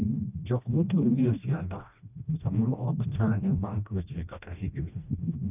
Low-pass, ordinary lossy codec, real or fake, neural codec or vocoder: 3.6 kHz; none; fake; codec, 16 kHz, 1 kbps, FreqCodec, smaller model